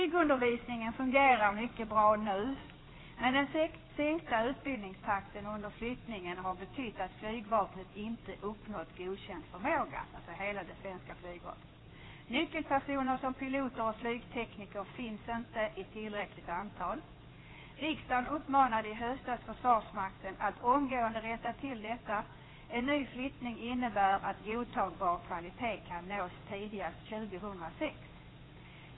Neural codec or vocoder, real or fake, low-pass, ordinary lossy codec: codec, 16 kHz in and 24 kHz out, 2.2 kbps, FireRedTTS-2 codec; fake; 7.2 kHz; AAC, 16 kbps